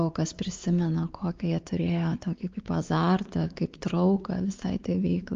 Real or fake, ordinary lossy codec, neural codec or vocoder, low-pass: fake; Opus, 32 kbps; codec, 16 kHz, 4 kbps, X-Codec, WavLM features, trained on Multilingual LibriSpeech; 7.2 kHz